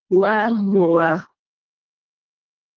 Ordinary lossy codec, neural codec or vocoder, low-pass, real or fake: Opus, 32 kbps; codec, 24 kHz, 1.5 kbps, HILCodec; 7.2 kHz; fake